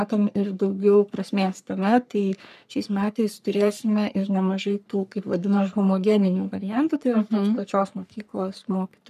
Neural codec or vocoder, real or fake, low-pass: codec, 44.1 kHz, 3.4 kbps, Pupu-Codec; fake; 14.4 kHz